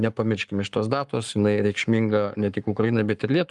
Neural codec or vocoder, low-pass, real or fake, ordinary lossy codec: codec, 44.1 kHz, 7.8 kbps, Pupu-Codec; 10.8 kHz; fake; Opus, 32 kbps